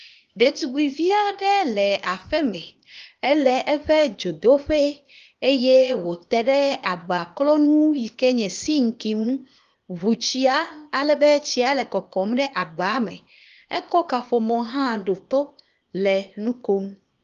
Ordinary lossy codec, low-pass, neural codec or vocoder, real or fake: Opus, 32 kbps; 7.2 kHz; codec, 16 kHz, 0.8 kbps, ZipCodec; fake